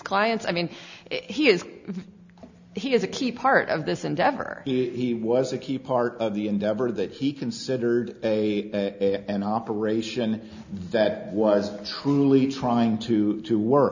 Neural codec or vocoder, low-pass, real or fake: none; 7.2 kHz; real